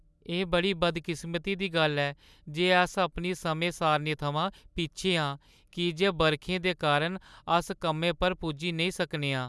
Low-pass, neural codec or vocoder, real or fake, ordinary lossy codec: none; none; real; none